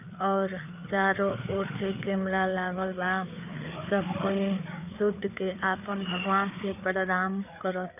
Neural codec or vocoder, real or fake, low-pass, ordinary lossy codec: codec, 16 kHz, 4 kbps, FreqCodec, larger model; fake; 3.6 kHz; none